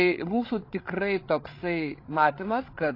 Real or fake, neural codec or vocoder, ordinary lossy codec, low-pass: fake; codec, 16 kHz, 8 kbps, FreqCodec, larger model; AAC, 32 kbps; 5.4 kHz